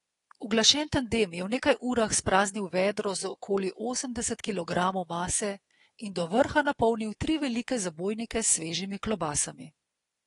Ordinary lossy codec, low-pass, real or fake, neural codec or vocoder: AAC, 32 kbps; 10.8 kHz; fake; codec, 24 kHz, 3.1 kbps, DualCodec